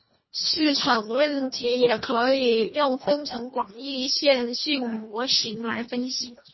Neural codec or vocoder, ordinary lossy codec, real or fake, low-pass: codec, 24 kHz, 1.5 kbps, HILCodec; MP3, 24 kbps; fake; 7.2 kHz